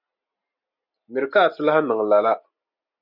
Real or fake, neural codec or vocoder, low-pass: real; none; 5.4 kHz